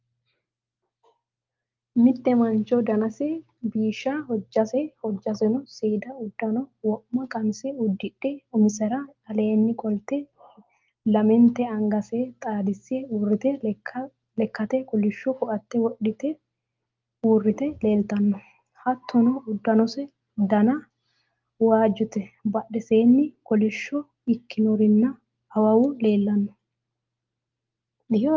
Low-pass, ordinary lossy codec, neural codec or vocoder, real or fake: 7.2 kHz; Opus, 32 kbps; none; real